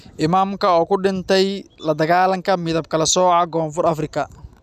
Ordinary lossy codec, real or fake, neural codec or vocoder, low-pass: none; real; none; 14.4 kHz